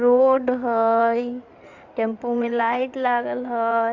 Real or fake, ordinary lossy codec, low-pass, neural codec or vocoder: fake; Opus, 64 kbps; 7.2 kHz; codec, 16 kHz in and 24 kHz out, 2.2 kbps, FireRedTTS-2 codec